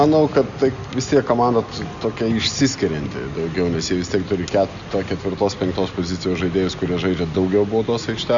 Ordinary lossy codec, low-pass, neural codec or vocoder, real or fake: Opus, 64 kbps; 7.2 kHz; none; real